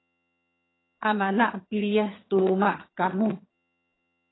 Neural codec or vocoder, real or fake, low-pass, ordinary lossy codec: vocoder, 22.05 kHz, 80 mel bands, HiFi-GAN; fake; 7.2 kHz; AAC, 16 kbps